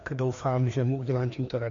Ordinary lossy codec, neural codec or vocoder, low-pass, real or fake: MP3, 48 kbps; codec, 16 kHz, 2 kbps, FreqCodec, larger model; 7.2 kHz; fake